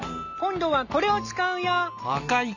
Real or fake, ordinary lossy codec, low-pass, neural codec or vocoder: real; none; 7.2 kHz; none